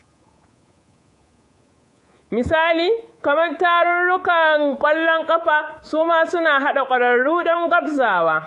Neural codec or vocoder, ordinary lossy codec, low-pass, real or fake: codec, 24 kHz, 3.1 kbps, DualCodec; AAC, 48 kbps; 10.8 kHz; fake